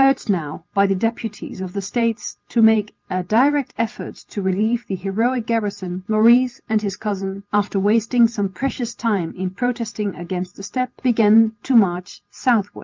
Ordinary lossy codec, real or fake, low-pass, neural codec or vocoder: Opus, 24 kbps; real; 7.2 kHz; none